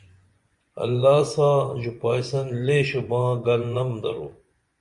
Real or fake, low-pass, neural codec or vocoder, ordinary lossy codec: fake; 10.8 kHz; vocoder, 24 kHz, 100 mel bands, Vocos; Opus, 64 kbps